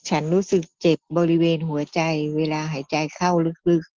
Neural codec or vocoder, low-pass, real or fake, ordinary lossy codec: none; 7.2 kHz; real; Opus, 16 kbps